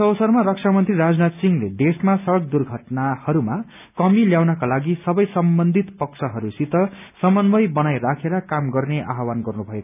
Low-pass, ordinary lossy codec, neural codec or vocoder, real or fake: 3.6 kHz; none; none; real